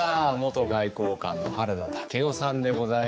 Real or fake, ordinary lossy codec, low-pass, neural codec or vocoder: fake; none; none; codec, 16 kHz, 4 kbps, X-Codec, HuBERT features, trained on general audio